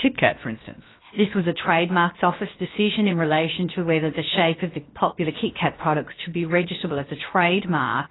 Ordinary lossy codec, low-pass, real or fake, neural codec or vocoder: AAC, 16 kbps; 7.2 kHz; fake; codec, 16 kHz, 0.7 kbps, FocalCodec